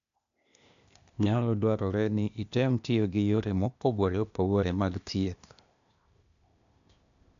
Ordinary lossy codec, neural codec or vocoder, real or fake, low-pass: none; codec, 16 kHz, 0.8 kbps, ZipCodec; fake; 7.2 kHz